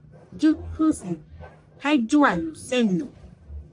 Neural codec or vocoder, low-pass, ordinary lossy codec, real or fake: codec, 44.1 kHz, 1.7 kbps, Pupu-Codec; 10.8 kHz; AAC, 64 kbps; fake